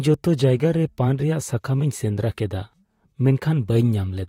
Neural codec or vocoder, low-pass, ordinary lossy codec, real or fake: vocoder, 44.1 kHz, 128 mel bands, Pupu-Vocoder; 19.8 kHz; AAC, 48 kbps; fake